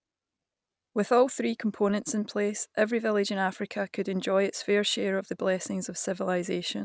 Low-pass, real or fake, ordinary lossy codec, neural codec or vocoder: none; real; none; none